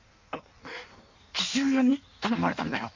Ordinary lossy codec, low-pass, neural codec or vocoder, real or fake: MP3, 64 kbps; 7.2 kHz; codec, 16 kHz in and 24 kHz out, 1.1 kbps, FireRedTTS-2 codec; fake